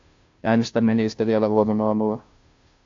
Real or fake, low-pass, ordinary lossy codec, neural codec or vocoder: fake; 7.2 kHz; AAC, 48 kbps; codec, 16 kHz, 0.5 kbps, FunCodec, trained on Chinese and English, 25 frames a second